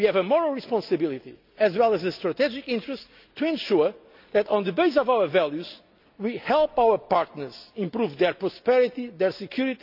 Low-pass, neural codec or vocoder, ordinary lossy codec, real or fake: 5.4 kHz; none; none; real